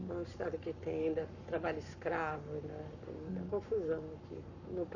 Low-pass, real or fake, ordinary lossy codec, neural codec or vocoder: 7.2 kHz; fake; none; codec, 44.1 kHz, 7.8 kbps, Pupu-Codec